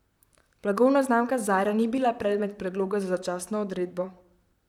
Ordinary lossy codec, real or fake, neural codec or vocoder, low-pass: none; fake; vocoder, 44.1 kHz, 128 mel bands, Pupu-Vocoder; 19.8 kHz